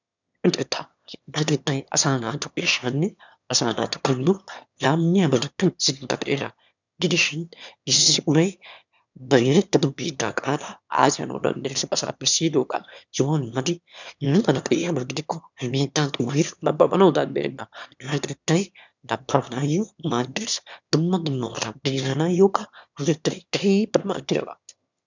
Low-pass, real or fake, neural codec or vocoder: 7.2 kHz; fake; autoencoder, 22.05 kHz, a latent of 192 numbers a frame, VITS, trained on one speaker